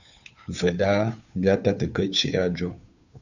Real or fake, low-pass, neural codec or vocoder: fake; 7.2 kHz; codec, 16 kHz, 2 kbps, FunCodec, trained on Chinese and English, 25 frames a second